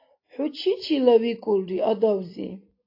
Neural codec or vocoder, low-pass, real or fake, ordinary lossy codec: none; 5.4 kHz; real; AAC, 32 kbps